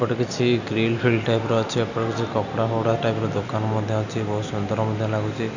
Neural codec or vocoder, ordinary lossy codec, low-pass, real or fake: none; none; 7.2 kHz; real